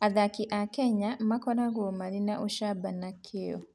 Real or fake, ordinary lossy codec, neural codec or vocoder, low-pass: real; none; none; none